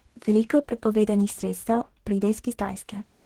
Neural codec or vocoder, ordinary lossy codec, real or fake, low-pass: codec, 44.1 kHz, 2.6 kbps, DAC; Opus, 16 kbps; fake; 19.8 kHz